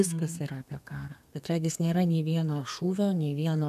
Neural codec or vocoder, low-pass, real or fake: codec, 32 kHz, 1.9 kbps, SNAC; 14.4 kHz; fake